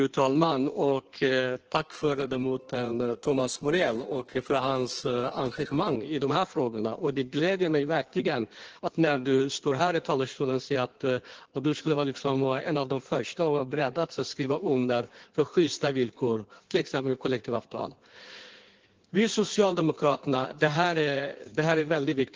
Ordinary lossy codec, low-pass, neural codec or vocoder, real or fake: Opus, 16 kbps; 7.2 kHz; codec, 16 kHz in and 24 kHz out, 1.1 kbps, FireRedTTS-2 codec; fake